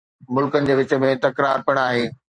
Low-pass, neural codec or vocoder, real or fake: 9.9 kHz; vocoder, 44.1 kHz, 128 mel bands every 512 samples, BigVGAN v2; fake